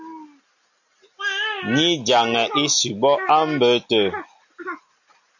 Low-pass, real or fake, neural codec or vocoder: 7.2 kHz; real; none